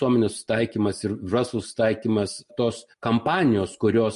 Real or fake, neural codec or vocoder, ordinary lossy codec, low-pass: real; none; MP3, 48 kbps; 10.8 kHz